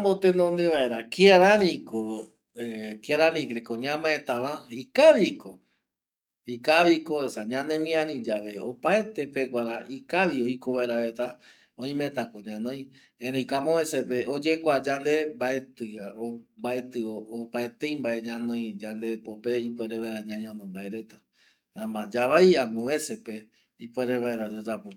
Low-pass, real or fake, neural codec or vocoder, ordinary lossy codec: 19.8 kHz; fake; codec, 44.1 kHz, 7.8 kbps, DAC; none